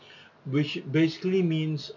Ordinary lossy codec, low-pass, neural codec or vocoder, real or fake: none; 7.2 kHz; none; real